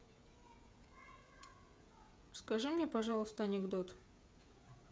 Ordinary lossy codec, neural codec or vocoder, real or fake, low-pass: none; codec, 16 kHz, 16 kbps, FreqCodec, smaller model; fake; none